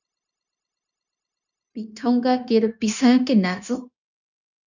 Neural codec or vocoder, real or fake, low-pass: codec, 16 kHz, 0.9 kbps, LongCat-Audio-Codec; fake; 7.2 kHz